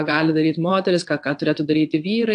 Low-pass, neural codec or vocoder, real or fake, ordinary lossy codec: 9.9 kHz; vocoder, 22.05 kHz, 80 mel bands, WaveNeXt; fake; AAC, 64 kbps